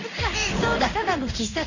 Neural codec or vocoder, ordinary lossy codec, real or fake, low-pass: codec, 16 kHz in and 24 kHz out, 1 kbps, XY-Tokenizer; none; fake; 7.2 kHz